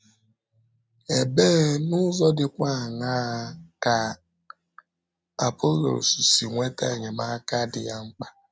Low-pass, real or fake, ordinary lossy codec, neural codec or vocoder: none; real; none; none